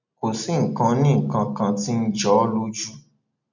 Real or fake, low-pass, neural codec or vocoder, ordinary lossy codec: real; 7.2 kHz; none; none